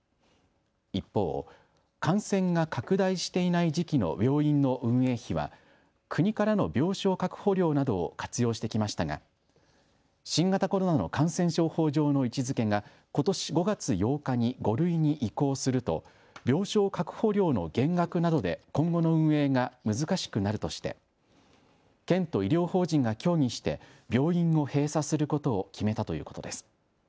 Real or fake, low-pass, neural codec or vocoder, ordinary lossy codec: real; none; none; none